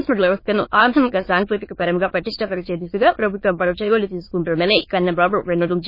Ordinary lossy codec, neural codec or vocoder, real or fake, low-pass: MP3, 24 kbps; autoencoder, 22.05 kHz, a latent of 192 numbers a frame, VITS, trained on many speakers; fake; 5.4 kHz